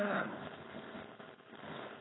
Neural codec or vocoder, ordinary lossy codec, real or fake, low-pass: codec, 16 kHz, 4.8 kbps, FACodec; AAC, 16 kbps; fake; 7.2 kHz